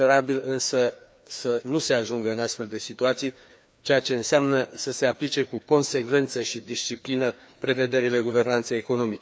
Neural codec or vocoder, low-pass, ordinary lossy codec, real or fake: codec, 16 kHz, 2 kbps, FreqCodec, larger model; none; none; fake